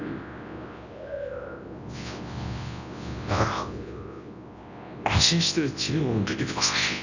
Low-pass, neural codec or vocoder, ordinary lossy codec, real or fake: 7.2 kHz; codec, 24 kHz, 0.9 kbps, WavTokenizer, large speech release; none; fake